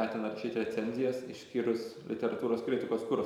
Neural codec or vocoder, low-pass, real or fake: vocoder, 44.1 kHz, 128 mel bands every 512 samples, BigVGAN v2; 19.8 kHz; fake